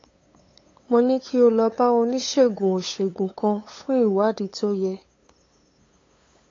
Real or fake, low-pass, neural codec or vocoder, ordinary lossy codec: fake; 7.2 kHz; codec, 16 kHz, 8 kbps, FunCodec, trained on LibriTTS, 25 frames a second; AAC, 32 kbps